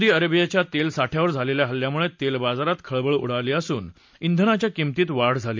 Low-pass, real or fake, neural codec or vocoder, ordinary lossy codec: 7.2 kHz; real; none; MP3, 64 kbps